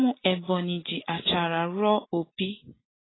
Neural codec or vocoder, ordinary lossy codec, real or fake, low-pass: none; AAC, 16 kbps; real; 7.2 kHz